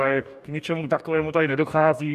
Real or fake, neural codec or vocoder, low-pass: fake; codec, 44.1 kHz, 2.6 kbps, DAC; 14.4 kHz